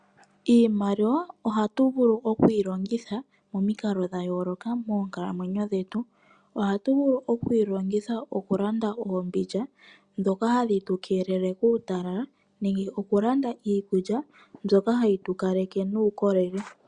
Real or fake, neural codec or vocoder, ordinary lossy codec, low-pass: real; none; Opus, 64 kbps; 9.9 kHz